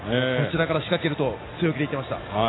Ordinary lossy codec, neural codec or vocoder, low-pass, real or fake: AAC, 16 kbps; none; 7.2 kHz; real